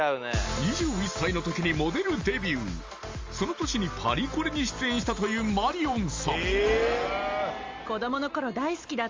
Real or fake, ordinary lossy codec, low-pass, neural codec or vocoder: real; Opus, 32 kbps; 7.2 kHz; none